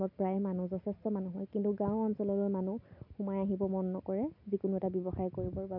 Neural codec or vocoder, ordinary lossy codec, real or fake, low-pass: none; none; real; 5.4 kHz